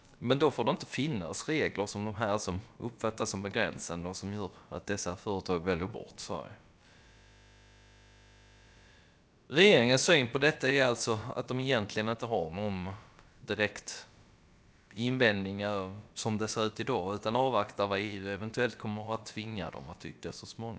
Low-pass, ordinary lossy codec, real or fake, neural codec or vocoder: none; none; fake; codec, 16 kHz, about 1 kbps, DyCAST, with the encoder's durations